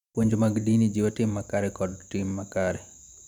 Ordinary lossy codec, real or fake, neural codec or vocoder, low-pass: none; fake; vocoder, 44.1 kHz, 128 mel bands every 512 samples, BigVGAN v2; 19.8 kHz